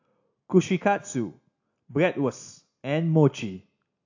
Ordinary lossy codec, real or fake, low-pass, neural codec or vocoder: AAC, 48 kbps; real; 7.2 kHz; none